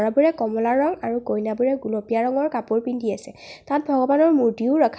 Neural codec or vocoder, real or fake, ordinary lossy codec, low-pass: none; real; none; none